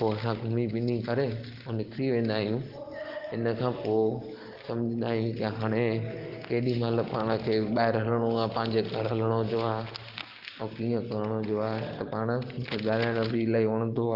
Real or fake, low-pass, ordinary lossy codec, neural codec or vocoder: real; 5.4 kHz; Opus, 16 kbps; none